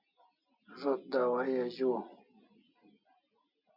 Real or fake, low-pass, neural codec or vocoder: real; 5.4 kHz; none